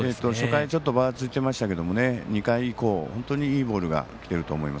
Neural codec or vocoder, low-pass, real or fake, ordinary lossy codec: none; none; real; none